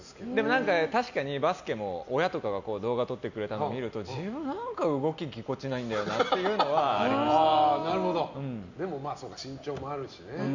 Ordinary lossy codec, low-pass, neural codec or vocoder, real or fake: none; 7.2 kHz; none; real